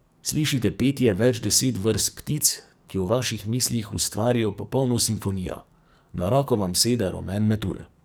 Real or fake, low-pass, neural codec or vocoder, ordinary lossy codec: fake; none; codec, 44.1 kHz, 2.6 kbps, SNAC; none